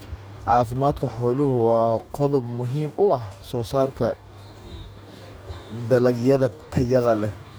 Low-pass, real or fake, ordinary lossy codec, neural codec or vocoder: none; fake; none; codec, 44.1 kHz, 2.6 kbps, DAC